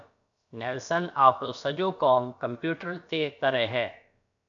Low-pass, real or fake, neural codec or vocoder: 7.2 kHz; fake; codec, 16 kHz, about 1 kbps, DyCAST, with the encoder's durations